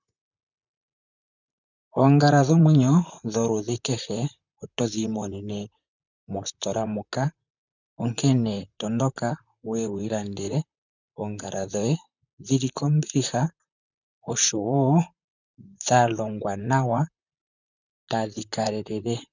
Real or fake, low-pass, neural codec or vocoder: fake; 7.2 kHz; vocoder, 44.1 kHz, 128 mel bands, Pupu-Vocoder